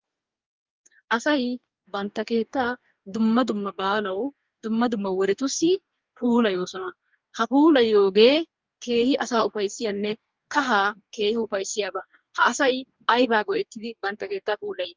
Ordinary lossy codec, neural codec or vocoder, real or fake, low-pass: Opus, 24 kbps; codec, 44.1 kHz, 2.6 kbps, DAC; fake; 7.2 kHz